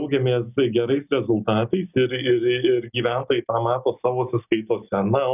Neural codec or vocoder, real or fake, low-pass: none; real; 3.6 kHz